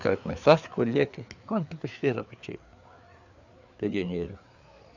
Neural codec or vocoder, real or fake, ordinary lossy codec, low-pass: codec, 16 kHz, 4 kbps, FreqCodec, larger model; fake; none; 7.2 kHz